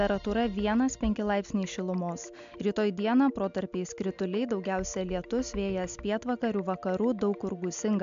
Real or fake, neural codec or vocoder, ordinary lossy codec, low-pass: real; none; MP3, 64 kbps; 7.2 kHz